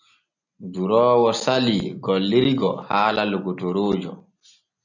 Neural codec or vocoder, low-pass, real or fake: none; 7.2 kHz; real